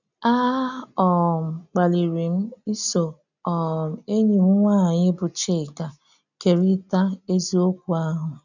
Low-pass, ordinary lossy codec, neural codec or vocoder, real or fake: 7.2 kHz; none; none; real